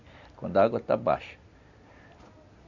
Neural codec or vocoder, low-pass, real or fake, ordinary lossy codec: none; 7.2 kHz; real; none